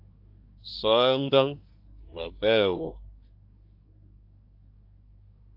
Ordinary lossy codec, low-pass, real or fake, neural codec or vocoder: AAC, 48 kbps; 5.4 kHz; fake; codec, 24 kHz, 1 kbps, SNAC